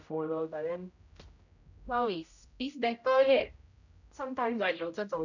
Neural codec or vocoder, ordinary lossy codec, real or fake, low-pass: codec, 16 kHz, 0.5 kbps, X-Codec, HuBERT features, trained on general audio; none; fake; 7.2 kHz